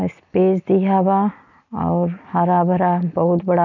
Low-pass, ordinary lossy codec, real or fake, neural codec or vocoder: 7.2 kHz; none; real; none